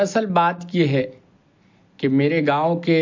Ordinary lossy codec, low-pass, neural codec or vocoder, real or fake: MP3, 48 kbps; 7.2 kHz; none; real